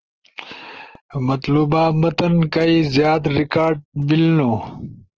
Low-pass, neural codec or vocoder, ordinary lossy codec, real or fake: 7.2 kHz; none; Opus, 16 kbps; real